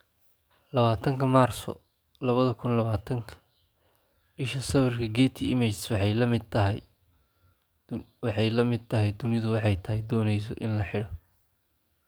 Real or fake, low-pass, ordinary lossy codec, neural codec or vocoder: fake; none; none; codec, 44.1 kHz, 7.8 kbps, DAC